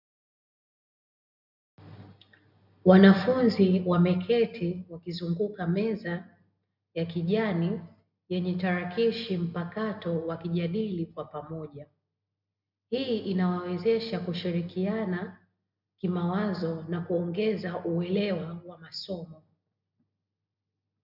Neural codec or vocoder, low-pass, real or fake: none; 5.4 kHz; real